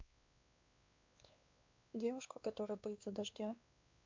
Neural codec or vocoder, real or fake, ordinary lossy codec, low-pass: codec, 16 kHz, 2 kbps, X-Codec, WavLM features, trained on Multilingual LibriSpeech; fake; MP3, 64 kbps; 7.2 kHz